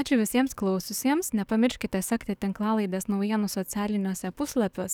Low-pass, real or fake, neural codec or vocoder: 19.8 kHz; fake; codec, 44.1 kHz, 7.8 kbps, DAC